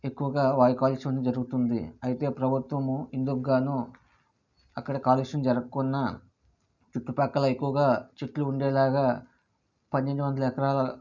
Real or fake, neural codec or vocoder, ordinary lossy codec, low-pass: real; none; none; 7.2 kHz